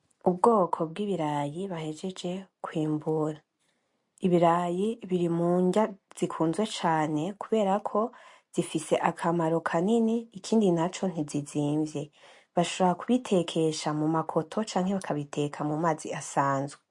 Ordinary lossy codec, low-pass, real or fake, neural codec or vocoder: MP3, 48 kbps; 10.8 kHz; real; none